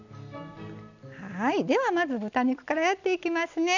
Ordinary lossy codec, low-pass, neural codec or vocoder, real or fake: none; 7.2 kHz; none; real